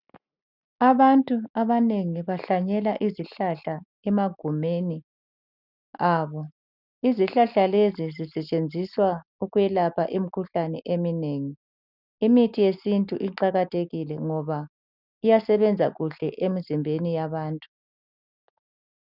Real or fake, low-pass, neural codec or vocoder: real; 5.4 kHz; none